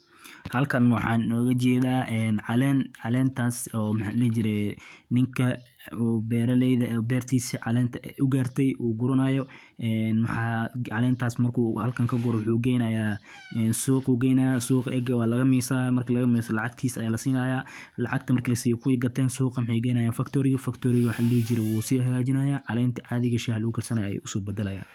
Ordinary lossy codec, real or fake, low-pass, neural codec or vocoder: none; fake; 19.8 kHz; codec, 44.1 kHz, 7.8 kbps, DAC